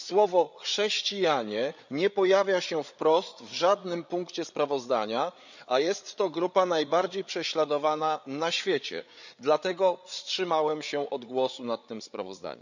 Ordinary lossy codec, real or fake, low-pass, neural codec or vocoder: none; fake; 7.2 kHz; codec, 16 kHz, 8 kbps, FreqCodec, larger model